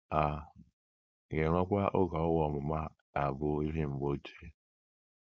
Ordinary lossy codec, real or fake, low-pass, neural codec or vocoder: none; fake; none; codec, 16 kHz, 4.8 kbps, FACodec